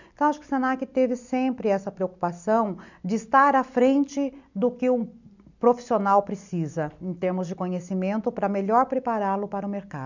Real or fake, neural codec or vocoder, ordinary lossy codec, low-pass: real; none; none; 7.2 kHz